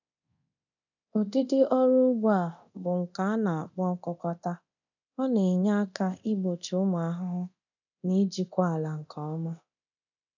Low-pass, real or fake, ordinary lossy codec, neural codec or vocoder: 7.2 kHz; fake; none; codec, 24 kHz, 0.9 kbps, DualCodec